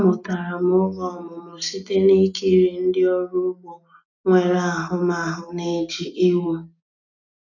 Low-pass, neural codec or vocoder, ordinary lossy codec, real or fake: 7.2 kHz; none; none; real